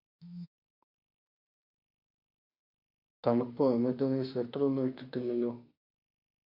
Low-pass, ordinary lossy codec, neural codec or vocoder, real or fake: 5.4 kHz; Opus, 64 kbps; autoencoder, 48 kHz, 32 numbers a frame, DAC-VAE, trained on Japanese speech; fake